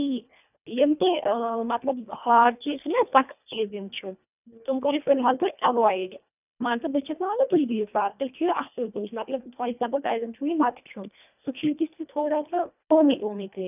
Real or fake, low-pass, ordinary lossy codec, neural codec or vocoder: fake; 3.6 kHz; none; codec, 24 kHz, 1.5 kbps, HILCodec